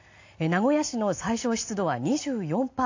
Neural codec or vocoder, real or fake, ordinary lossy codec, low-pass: none; real; AAC, 48 kbps; 7.2 kHz